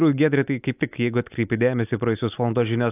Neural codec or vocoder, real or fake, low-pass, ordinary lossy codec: none; real; 3.6 kHz; AAC, 32 kbps